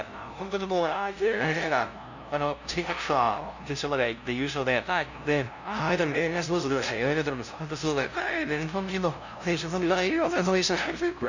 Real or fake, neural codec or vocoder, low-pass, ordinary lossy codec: fake; codec, 16 kHz, 0.5 kbps, FunCodec, trained on LibriTTS, 25 frames a second; 7.2 kHz; none